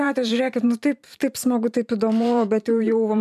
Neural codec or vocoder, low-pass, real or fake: vocoder, 44.1 kHz, 128 mel bands every 512 samples, BigVGAN v2; 14.4 kHz; fake